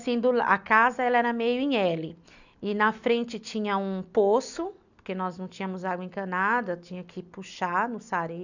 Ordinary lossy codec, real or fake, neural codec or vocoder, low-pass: none; real; none; 7.2 kHz